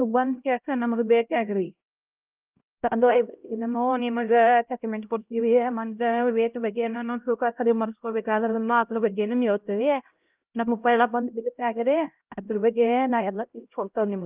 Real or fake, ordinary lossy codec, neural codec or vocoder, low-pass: fake; Opus, 24 kbps; codec, 16 kHz, 0.5 kbps, X-Codec, HuBERT features, trained on LibriSpeech; 3.6 kHz